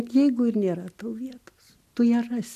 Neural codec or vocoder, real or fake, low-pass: none; real; 14.4 kHz